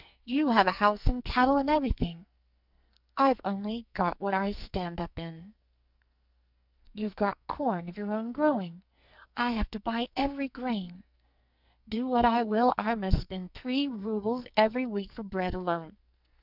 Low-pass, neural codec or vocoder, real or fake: 5.4 kHz; codec, 44.1 kHz, 2.6 kbps, SNAC; fake